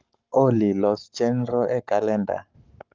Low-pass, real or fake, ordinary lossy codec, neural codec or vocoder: 7.2 kHz; fake; Opus, 32 kbps; codec, 44.1 kHz, 7.8 kbps, Pupu-Codec